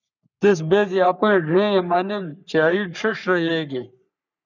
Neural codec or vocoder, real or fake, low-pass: codec, 44.1 kHz, 3.4 kbps, Pupu-Codec; fake; 7.2 kHz